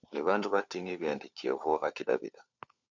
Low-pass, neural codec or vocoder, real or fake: 7.2 kHz; codec, 16 kHz, 4 kbps, FunCodec, trained on LibriTTS, 50 frames a second; fake